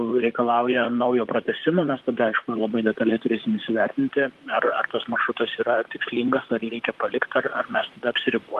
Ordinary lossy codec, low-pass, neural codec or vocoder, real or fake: Opus, 24 kbps; 14.4 kHz; vocoder, 44.1 kHz, 128 mel bands, Pupu-Vocoder; fake